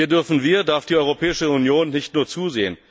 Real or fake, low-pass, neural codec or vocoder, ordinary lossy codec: real; none; none; none